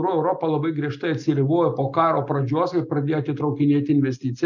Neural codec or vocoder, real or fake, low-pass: none; real; 7.2 kHz